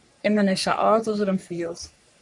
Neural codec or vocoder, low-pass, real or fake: codec, 44.1 kHz, 3.4 kbps, Pupu-Codec; 10.8 kHz; fake